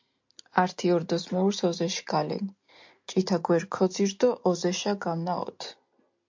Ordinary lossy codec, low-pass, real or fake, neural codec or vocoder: MP3, 48 kbps; 7.2 kHz; real; none